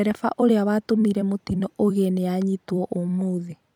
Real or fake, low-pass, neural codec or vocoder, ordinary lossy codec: fake; 19.8 kHz; vocoder, 44.1 kHz, 128 mel bands every 256 samples, BigVGAN v2; none